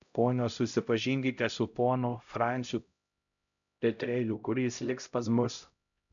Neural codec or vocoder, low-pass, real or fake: codec, 16 kHz, 0.5 kbps, X-Codec, HuBERT features, trained on LibriSpeech; 7.2 kHz; fake